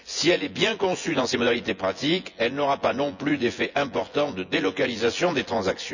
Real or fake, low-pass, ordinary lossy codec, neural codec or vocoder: fake; 7.2 kHz; none; vocoder, 24 kHz, 100 mel bands, Vocos